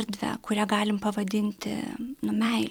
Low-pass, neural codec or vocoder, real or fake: 19.8 kHz; vocoder, 44.1 kHz, 128 mel bands every 256 samples, BigVGAN v2; fake